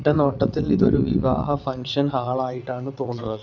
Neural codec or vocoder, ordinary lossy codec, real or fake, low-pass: vocoder, 22.05 kHz, 80 mel bands, WaveNeXt; none; fake; 7.2 kHz